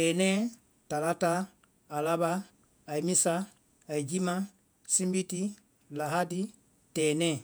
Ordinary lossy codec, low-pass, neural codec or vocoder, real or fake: none; none; vocoder, 48 kHz, 128 mel bands, Vocos; fake